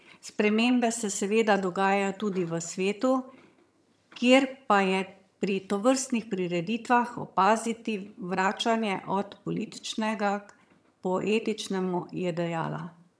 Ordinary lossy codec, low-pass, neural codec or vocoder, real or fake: none; none; vocoder, 22.05 kHz, 80 mel bands, HiFi-GAN; fake